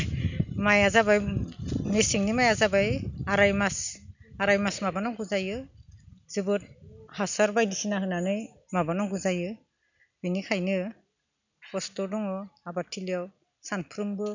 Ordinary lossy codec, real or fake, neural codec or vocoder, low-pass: none; real; none; 7.2 kHz